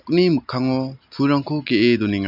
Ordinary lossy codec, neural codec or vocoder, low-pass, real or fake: none; none; 5.4 kHz; real